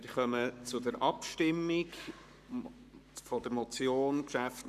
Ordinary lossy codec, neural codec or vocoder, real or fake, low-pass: none; codec, 44.1 kHz, 7.8 kbps, Pupu-Codec; fake; 14.4 kHz